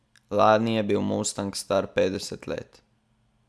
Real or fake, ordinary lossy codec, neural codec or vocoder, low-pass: real; none; none; none